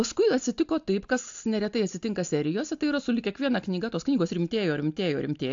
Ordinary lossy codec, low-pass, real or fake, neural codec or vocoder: AAC, 64 kbps; 7.2 kHz; real; none